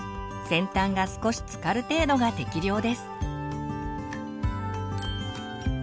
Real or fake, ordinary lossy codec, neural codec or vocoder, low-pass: real; none; none; none